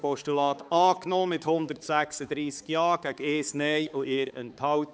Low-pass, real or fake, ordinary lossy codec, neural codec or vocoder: none; fake; none; codec, 16 kHz, 4 kbps, X-Codec, HuBERT features, trained on balanced general audio